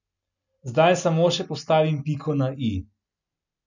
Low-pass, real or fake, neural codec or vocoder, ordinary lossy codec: 7.2 kHz; real; none; none